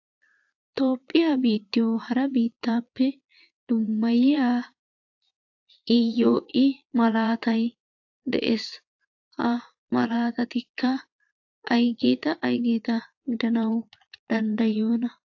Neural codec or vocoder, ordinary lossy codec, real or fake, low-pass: vocoder, 22.05 kHz, 80 mel bands, Vocos; AAC, 48 kbps; fake; 7.2 kHz